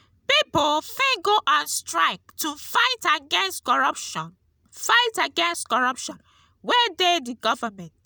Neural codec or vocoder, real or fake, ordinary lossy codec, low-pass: none; real; none; none